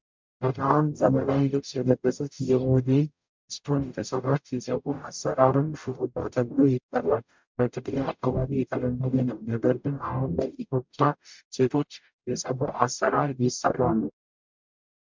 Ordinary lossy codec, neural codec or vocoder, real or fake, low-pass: MP3, 64 kbps; codec, 44.1 kHz, 0.9 kbps, DAC; fake; 7.2 kHz